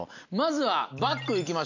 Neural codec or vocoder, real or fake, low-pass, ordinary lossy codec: none; real; 7.2 kHz; none